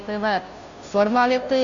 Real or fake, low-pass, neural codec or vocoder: fake; 7.2 kHz; codec, 16 kHz, 0.5 kbps, FunCodec, trained on Chinese and English, 25 frames a second